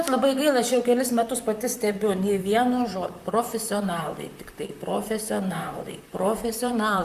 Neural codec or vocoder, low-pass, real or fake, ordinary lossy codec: vocoder, 44.1 kHz, 128 mel bands, Pupu-Vocoder; 14.4 kHz; fake; Opus, 64 kbps